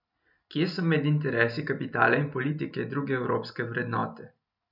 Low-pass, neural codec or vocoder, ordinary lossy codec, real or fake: 5.4 kHz; none; none; real